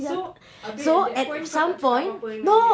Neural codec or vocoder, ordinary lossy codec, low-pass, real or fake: none; none; none; real